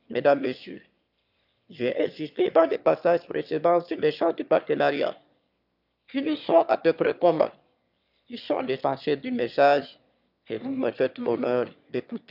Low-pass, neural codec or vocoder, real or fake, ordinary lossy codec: 5.4 kHz; autoencoder, 22.05 kHz, a latent of 192 numbers a frame, VITS, trained on one speaker; fake; none